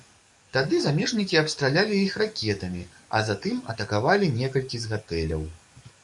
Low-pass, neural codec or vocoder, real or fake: 10.8 kHz; codec, 44.1 kHz, 7.8 kbps, DAC; fake